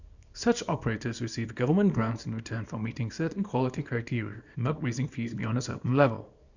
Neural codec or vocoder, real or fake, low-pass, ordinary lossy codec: codec, 24 kHz, 0.9 kbps, WavTokenizer, small release; fake; 7.2 kHz; none